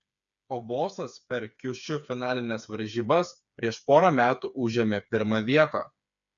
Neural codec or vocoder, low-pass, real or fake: codec, 16 kHz, 4 kbps, FreqCodec, smaller model; 7.2 kHz; fake